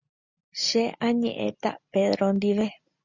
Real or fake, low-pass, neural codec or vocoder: real; 7.2 kHz; none